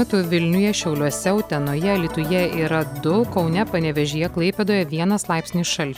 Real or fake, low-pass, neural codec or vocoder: real; 19.8 kHz; none